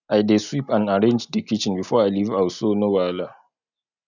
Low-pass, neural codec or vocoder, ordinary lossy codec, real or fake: 7.2 kHz; none; none; real